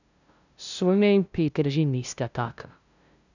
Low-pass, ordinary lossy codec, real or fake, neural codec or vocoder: 7.2 kHz; none; fake; codec, 16 kHz, 0.5 kbps, FunCodec, trained on LibriTTS, 25 frames a second